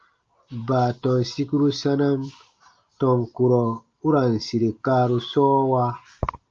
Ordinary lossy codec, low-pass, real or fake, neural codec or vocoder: Opus, 24 kbps; 7.2 kHz; real; none